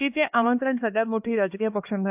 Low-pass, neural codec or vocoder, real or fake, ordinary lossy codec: 3.6 kHz; codec, 16 kHz, 2 kbps, X-Codec, HuBERT features, trained on LibriSpeech; fake; AAC, 32 kbps